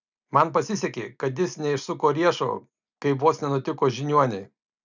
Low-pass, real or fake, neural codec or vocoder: 7.2 kHz; real; none